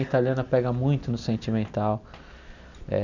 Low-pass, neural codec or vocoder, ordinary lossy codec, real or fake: 7.2 kHz; none; none; real